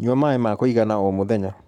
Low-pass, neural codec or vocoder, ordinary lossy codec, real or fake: 19.8 kHz; codec, 44.1 kHz, 7.8 kbps, Pupu-Codec; none; fake